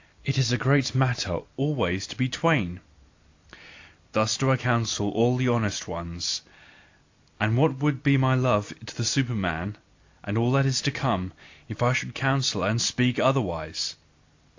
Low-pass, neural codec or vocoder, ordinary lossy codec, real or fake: 7.2 kHz; none; AAC, 48 kbps; real